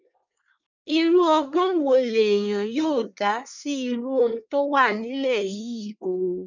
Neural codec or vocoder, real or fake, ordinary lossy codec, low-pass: codec, 24 kHz, 1 kbps, SNAC; fake; none; 7.2 kHz